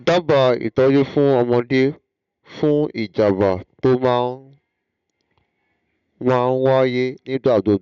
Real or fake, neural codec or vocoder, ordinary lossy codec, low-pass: real; none; none; 7.2 kHz